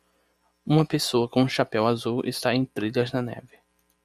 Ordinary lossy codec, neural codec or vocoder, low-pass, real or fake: MP3, 96 kbps; none; 14.4 kHz; real